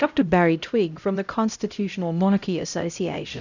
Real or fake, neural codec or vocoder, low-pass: fake; codec, 16 kHz, 0.5 kbps, X-Codec, HuBERT features, trained on LibriSpeech; 7.2 kHz